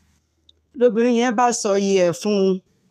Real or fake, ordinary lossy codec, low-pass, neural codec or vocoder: fake; none; 14.4 kHz; codec, 32 kHz, 1.9 kbps, SNAC